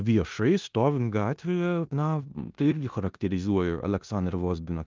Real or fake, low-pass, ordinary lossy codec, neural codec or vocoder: fake; 7.2 kHz; Opus, 24 kbps; codec, 16 kHz in and 24 kHz out, 0.9 kbps, LongCat-Audio-Codec, four codebook decoder